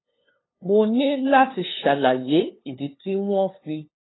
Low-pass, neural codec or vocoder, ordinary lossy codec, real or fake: 7.2 kHz; codec, 16 kHz, 2 kbps, FunCodec, trained on LibriTTS, 25 frames a second; AAC, 16 kbps; fake